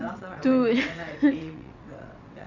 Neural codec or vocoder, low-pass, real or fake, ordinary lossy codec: vocoder, 22.05 kHz, 80 mel bands, WaveNeXt; 7.2 kHz; fake; none